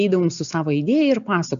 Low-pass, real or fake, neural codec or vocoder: 7.2 kHz; real; none